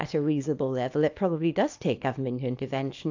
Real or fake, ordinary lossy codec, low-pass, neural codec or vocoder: fake; AAC, 48 kbps; 7.2 kHz; codec, 24 kHz, 0.9 kbps, WavTokenizer, small release